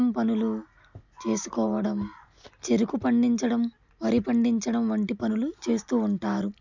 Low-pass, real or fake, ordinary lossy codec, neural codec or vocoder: 7.2 kHz; real; none; none